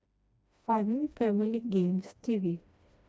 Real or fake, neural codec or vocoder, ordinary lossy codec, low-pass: fake; codec, 16 kHz, 1 kbps, FreqCodec, smaller model; none; none